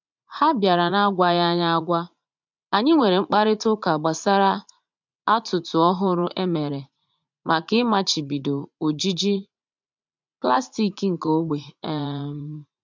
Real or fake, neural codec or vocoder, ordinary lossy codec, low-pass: fake; vocoder, 44.1 kHz, 80 mel bands, Vocos; MP3, 64 kbps; 7.2 kHz